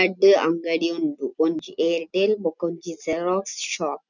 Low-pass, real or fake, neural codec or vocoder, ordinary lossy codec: 7.2 kHz; real; none; none